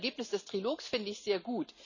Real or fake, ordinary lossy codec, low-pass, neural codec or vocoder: real; none; 7.2 kHz; none